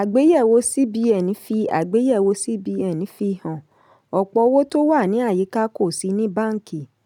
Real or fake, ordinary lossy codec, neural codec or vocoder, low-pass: real; none; none; 19.8 kHz